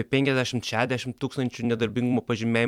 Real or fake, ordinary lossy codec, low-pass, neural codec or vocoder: fake; MP3, 96 kbps; 19.8 kHz; vocoder, 44.1 kHz, 128 mel bands every 256 samples, BigVGAN v2